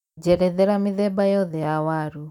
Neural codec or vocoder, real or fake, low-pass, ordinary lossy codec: vocoder, 44.1 kHz, 128 mel bands every 256 samples, BigVGAN v2; fake; 19.8 kHz; none